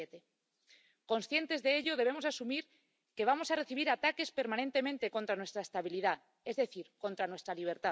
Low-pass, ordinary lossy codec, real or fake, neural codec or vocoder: none; none; real; none